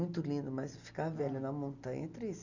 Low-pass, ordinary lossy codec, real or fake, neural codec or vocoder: 7.2 kHz; none; real; none